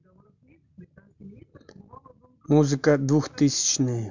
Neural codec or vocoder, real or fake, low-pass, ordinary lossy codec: none; real; 7.2 kHz; MP3, 64 kbps